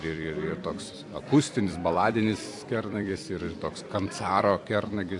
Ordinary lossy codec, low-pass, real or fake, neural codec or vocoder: AAC, 64 kbps; 10.8 kHz; fake; vocoder, 44.1 kHz, 128 mel bands every 256 samples, BigVGAN v2